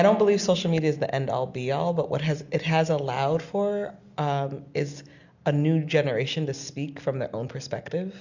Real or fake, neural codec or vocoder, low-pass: real; none; 7.2 kHz